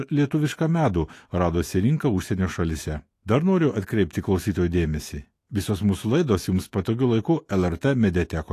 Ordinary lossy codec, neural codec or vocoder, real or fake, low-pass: AAC, 48 kbps; autoencoder, 48 kHz, 128 numbers a frame, DAC-VAE, trained on Japanese speech; fake; 14.4 kHz